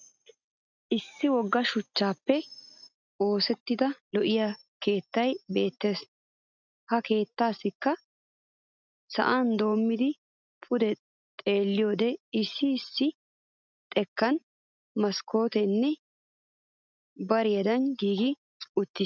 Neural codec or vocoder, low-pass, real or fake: none; 7.2 kHz; real